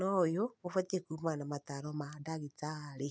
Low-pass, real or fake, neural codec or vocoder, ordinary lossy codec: none; real; none; none